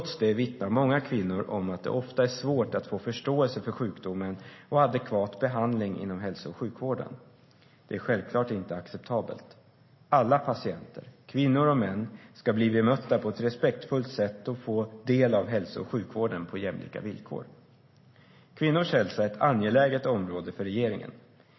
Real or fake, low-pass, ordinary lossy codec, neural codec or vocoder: real; 7.2 kHz; MP3, 24 kbps; none